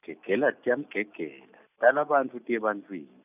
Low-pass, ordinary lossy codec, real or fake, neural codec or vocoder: 3.6 kHz; none; real; none